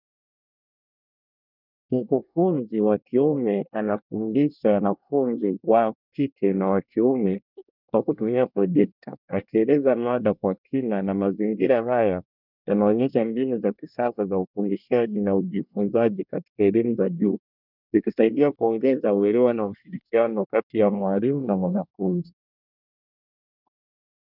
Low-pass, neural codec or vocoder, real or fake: 5.4 kHz; codec, 24 kHz, 1 kbps, SNAC; fake